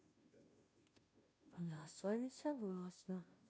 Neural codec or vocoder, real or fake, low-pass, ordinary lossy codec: codec, 16 kHz, 0.5 kbps, FunCodec, trained on Chinese and English, 25 frames a second; fake; none; none